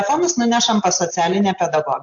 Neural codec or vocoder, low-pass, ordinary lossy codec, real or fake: none; 7.2 kHz; AAC, 64 kbps; real